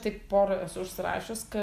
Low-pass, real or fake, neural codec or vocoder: 14.4 kHz; real; none